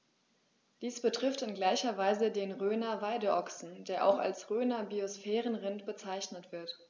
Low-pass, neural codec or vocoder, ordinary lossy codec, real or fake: none; none; none; real